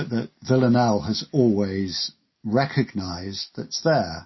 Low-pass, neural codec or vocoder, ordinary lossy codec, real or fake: 7.2 kHz; none; MP3, 24 kbps; real